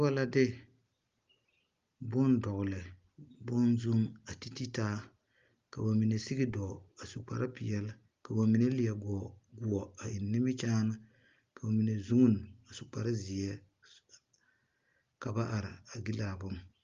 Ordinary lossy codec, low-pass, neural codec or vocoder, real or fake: Opus, 32 kbps; 7.2 kHz; none; real